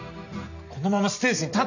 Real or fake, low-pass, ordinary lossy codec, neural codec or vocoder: real; 7.2 kHz; none; none